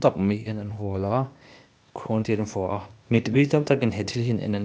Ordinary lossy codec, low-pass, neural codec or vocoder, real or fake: none; none; codec, 16 kHz, 0.8 kbps, ZipCodec; fake